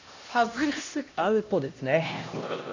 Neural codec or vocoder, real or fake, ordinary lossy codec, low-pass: codec, 16 kHz, 1 kbps, X-Codec, HuBERT features, trained on LibriSpeech; fake; AAC, 48 kbps; 7.2 kHz